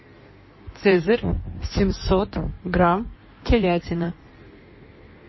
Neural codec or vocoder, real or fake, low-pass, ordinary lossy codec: codec, 16 kHz in and 24 kHz out, 1.1 kbps, FireRedTTS-2 codec; fake; 7.2 kHz; MP3, 24 kbps